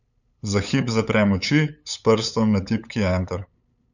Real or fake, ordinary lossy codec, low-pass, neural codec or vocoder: fake; none; 7.2 kHz; codec, 16 kHz, 8 kbps, FunCodec, trained on LibriTTS, 25 frames a second